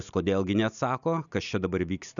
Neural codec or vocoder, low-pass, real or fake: none; 7.2 kHz; real